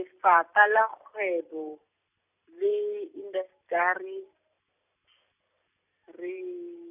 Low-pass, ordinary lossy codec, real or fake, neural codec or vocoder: 3.6 kHz; none; real; none